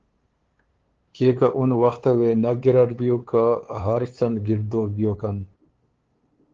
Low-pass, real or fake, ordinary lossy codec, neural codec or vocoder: 7.2 kHz; fake; Opus, 16 kbps; codec, 16 kHz, 2 kbps, FunCodec, trained on LibriTTS, 25 frames a second